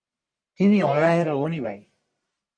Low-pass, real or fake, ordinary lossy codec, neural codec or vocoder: 9.9 kHz; fake; MP3, 48 kbps; codec, 44.1 kHz, 1.7 kbps, Pupu-Codec